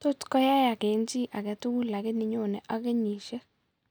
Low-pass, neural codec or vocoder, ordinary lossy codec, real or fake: none; none; none; real